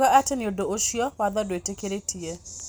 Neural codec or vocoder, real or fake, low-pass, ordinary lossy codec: none; real; none; none